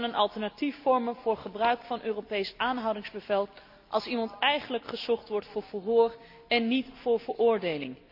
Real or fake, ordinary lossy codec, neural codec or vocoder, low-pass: real; AAC, 48 kbps; none; 5.4 kHz